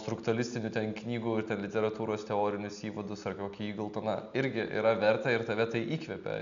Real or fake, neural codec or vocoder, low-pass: real; none; 7.2 kHz